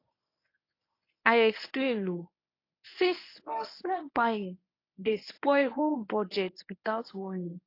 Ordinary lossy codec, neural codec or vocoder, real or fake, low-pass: AAC, 32 kbps; codec, 24 kHz, 0.9 kbps, WavTokenizer, medium speech release version 1; fake; 5.4 kHz